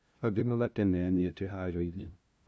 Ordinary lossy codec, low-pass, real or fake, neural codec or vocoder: none; none; fake; codec, 16 kHz, 0.5 kbps, FunCodec, trained on LibriTTS, 25 frames a second